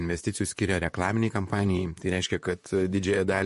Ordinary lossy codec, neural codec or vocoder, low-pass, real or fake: MP3, 48 kbps; vocoder, 44.1 kHz, 128 mel bands, Pupu-Vocoder; 14.4 kHz; fake